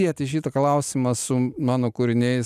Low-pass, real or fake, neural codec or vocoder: 14.4 kHz; real; none